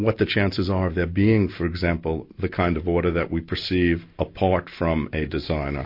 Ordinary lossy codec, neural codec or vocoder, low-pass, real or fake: MP3, 32 kbps; none; 5.4 kHz; real